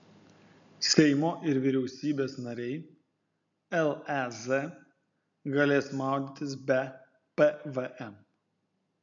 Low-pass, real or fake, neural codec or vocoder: 7.2 kHz; real; none